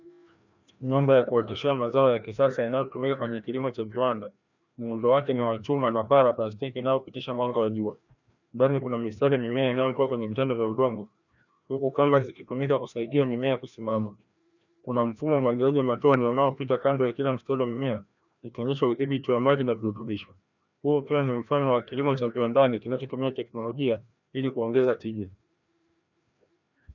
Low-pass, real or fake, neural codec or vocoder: 7.2 kHz; fake; codec, 16 kHz, 1 kbps, FreqCodec, larger model